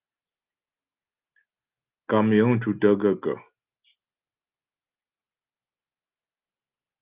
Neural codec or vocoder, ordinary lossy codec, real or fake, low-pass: none; Opus, 32 kbps; real; 3.6 kHz